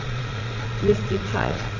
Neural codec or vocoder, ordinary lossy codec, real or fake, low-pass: vocoder, 22.05 kHz, 80 mel bands, Vocos; none; fake; 7.2 kHz